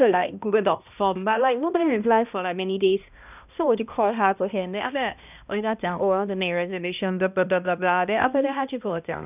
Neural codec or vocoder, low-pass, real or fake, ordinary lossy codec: codec, 16 kHz, 1 kbps, X-Codec, HuBERT features, trained on balanced general audio; 3.6 kHz; fake; none